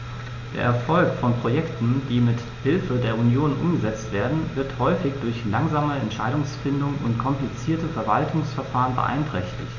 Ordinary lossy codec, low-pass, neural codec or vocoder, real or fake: none; 7.2 kHz; none; real